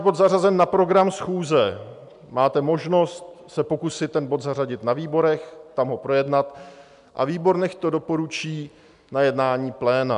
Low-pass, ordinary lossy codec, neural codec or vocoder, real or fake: 10.8 kHz; MP3, 96 kbps; none; real